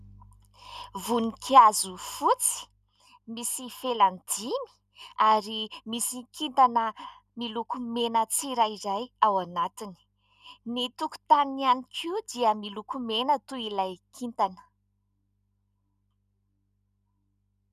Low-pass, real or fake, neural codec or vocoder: 14.4 kHz; real; none